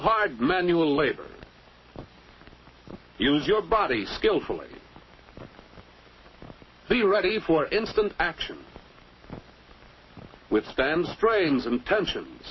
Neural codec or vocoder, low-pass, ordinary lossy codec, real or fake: codec, 44.1 kHz, 7.8 kbps, Pupu-Codec; 7.2 kHz; MP3, 24 kbps; fake